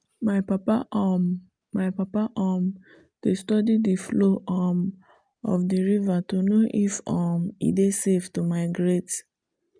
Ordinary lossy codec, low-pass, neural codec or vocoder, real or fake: none; 9.9 kHz; none; real